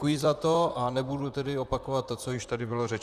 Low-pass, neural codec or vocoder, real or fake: 14.4 kHz; vocoder, 44.1 kHz, 128 mel bands, Pupu-Vocoder; fake